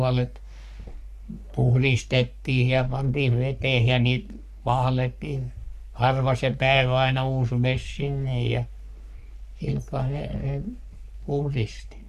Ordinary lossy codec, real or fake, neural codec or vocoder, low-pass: none; fake; codec, 44.1 kHz, 3.4 kbps, Pupu-Codec; 14.4 kHz